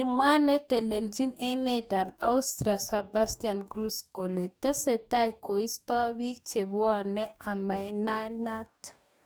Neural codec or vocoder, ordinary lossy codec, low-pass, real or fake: codec, 44.1 kHz, 2.6 kbps, DAC; none; none; fake